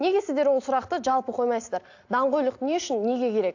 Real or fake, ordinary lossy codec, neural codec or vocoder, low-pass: real; AAC, 48 kbps; none; 7.2 kHz